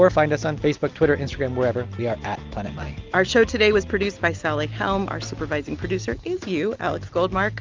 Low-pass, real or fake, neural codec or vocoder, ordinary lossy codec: 7.2 kHz; real; none; Opus, 24 kbps